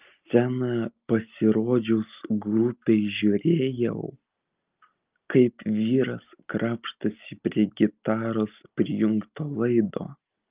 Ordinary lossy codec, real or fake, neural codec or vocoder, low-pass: Opus, 32 kbps; real; none; 3.6 kHz